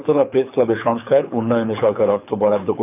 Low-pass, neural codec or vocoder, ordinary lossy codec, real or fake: 3.6 kHz; codec, 24 kHz, 6 kbps, HILCodec; none; fake